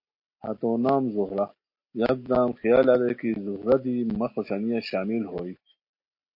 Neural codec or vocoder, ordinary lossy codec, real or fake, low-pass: none; MP3, 32 kbps; real; 5.4 kHz